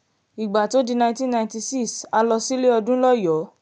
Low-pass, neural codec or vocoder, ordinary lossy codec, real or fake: 9.9 kHz; none; none; real